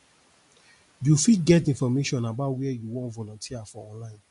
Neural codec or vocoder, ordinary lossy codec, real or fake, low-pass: none; MP3, 64 kbps; real; 10.8 kHz